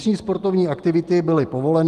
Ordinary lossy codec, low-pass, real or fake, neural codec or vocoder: Opus, 24 kbps; 14.4 kHz; real; none